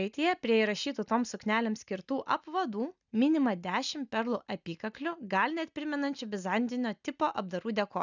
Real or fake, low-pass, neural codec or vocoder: real; 7.2 kHz; none